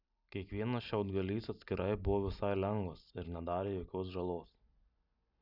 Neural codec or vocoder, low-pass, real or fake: none; 5.4 kHz; real